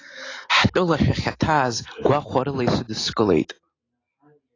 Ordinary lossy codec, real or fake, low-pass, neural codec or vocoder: AAC, 32 kbps; real; 7.2 kHz; none